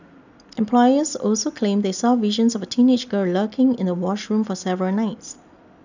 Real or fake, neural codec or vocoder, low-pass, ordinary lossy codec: real; none; 7.2 kHz; none